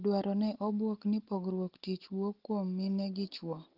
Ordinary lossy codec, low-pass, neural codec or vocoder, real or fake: Opus, 16 kbps; 5.4 kHz; none; real